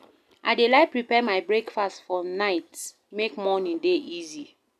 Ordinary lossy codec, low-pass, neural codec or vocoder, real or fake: none; 14.4 kHz; none; real